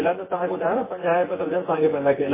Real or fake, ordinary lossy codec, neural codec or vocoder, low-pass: fake; MP3, 16 kbps; vocoder, 24 kHz, 100 mel bands, Vocos; 3.6 kHz